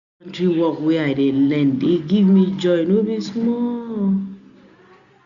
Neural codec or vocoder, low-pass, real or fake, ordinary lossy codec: none; 7.2 kHz; real; none